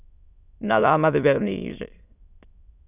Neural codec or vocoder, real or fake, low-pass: autoencoder, 22.05 kHz, a latent of 192 numbers a frame, VITS, trained on many speakers; fake; 3.6 kHz